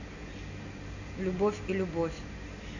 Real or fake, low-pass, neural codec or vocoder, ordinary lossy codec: real; 7.2 kHz; none; none